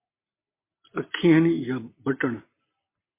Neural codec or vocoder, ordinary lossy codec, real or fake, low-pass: none; MP3, 24 kbps; real; 3.6 kHz